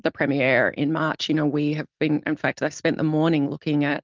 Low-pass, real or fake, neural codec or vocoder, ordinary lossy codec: 7.2 kHz; real; none; Opus, 32 kbps